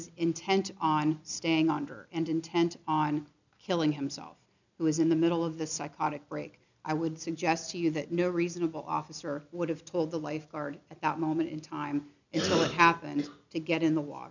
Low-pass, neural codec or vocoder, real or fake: 7.2 kHz; none; real